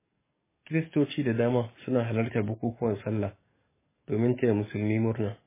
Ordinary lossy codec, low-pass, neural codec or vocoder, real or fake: MP3, 16 kbps; 3.6 kHz; codec, 44.1 kHz, 7.8 kbps, DAC; fake